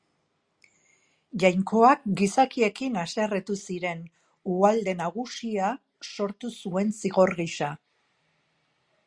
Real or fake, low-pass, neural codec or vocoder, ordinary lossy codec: real; 9.9 kHz; none; Opus, 64 kbps